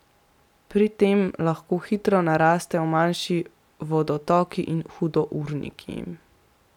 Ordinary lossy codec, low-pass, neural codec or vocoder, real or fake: none; 19.8 kHz; none; real